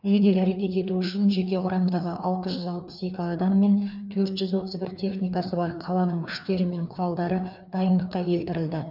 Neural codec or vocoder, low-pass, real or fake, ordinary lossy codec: codec, 16 kHz, 2 kbps, FreqCodec, larger model; 5.4 kHz; fake; none